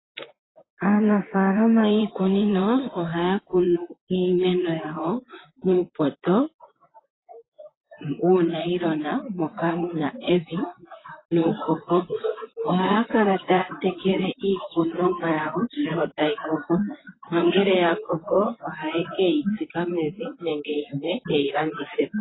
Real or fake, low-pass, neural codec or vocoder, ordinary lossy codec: fake; 7.2 kHz; vocoder, 44.1 kHz, 128 mel bands, Pupu-Vocoder; AAC, 16 kbps